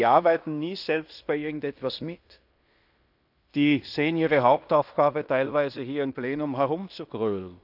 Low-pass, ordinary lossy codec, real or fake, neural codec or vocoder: 5.4 kHz; none; fake; codec, 16 kHz in and 24 kHz out, 0.9 kbps, LongCat-Audio-Codec, fine tuned four codebook decoder